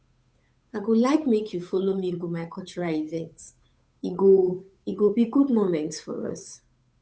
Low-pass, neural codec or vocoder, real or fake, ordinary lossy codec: none; codec, 16 kHz, 8 kbps, FunCodec, trained on Chinese and English, 25 frames a second; fake; none